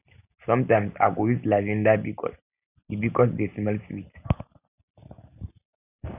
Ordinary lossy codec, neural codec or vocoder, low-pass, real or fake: none; none; 3.6 kHz; real